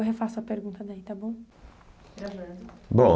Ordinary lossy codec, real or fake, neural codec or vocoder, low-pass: none; real; none; none